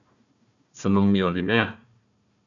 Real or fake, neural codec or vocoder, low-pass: fake; codec, 16 kHz, 1 kbps, FunCodec, trained on Chinese and English, 50 frames a second; 7.2 kHz